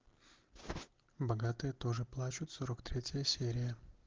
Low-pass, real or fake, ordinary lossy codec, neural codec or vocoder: 7.2 kHz; real; Opus, 16 kbps; none